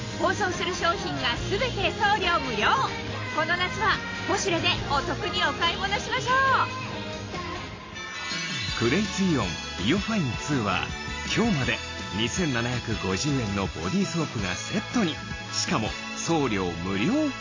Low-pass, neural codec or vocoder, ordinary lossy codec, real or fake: 7.2 kHz; none; AAC, 32 kbps; real